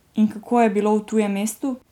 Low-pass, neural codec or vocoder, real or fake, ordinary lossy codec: 19.8 kHz; none; real; none